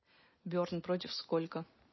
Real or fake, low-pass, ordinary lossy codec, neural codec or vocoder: real; 7.2 kHz; MP3, 24 kbps; none